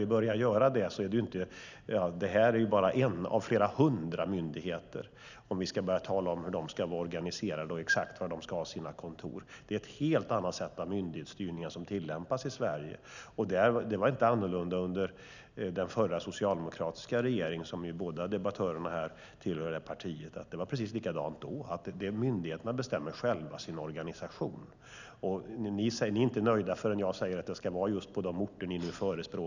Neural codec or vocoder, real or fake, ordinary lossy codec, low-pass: none; real; none; 7.2 kHz